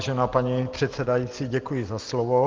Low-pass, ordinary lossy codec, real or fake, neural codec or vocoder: 7.2 kHz; Opus, 16 kbps; real; none